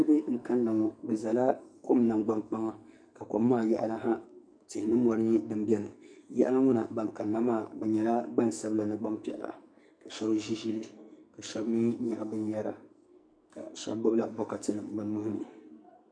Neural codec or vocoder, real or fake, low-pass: codec, 44.1 kHz, 2.6 kbps, SNAC; fake; 9.9 kHz